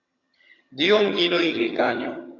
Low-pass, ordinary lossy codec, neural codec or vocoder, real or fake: 7.2 kHz; AAC, 32 kbps; vocoder, 22.05 kHz, 80 mel bands, HiFi-GAN; fake